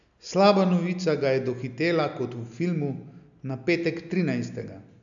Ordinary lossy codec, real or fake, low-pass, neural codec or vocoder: none; real; 7.2 kHz; none